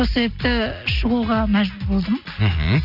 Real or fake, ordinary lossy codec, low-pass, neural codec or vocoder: real; none; 5.4 kHz; none